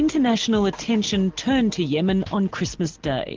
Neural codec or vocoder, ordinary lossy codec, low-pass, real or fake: none; Opus, 16 kbps; 7.2 kHz; real